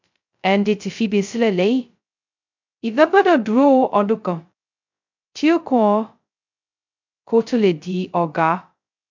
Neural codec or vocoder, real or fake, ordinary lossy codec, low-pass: codec, 16 kHz, 0.2 kbps, FocalCodec; fake; MP3, 64 kbps; 7.2 kHz